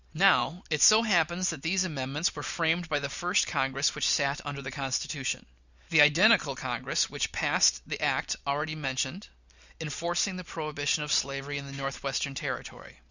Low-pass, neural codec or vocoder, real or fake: 7.2 kHz; none; real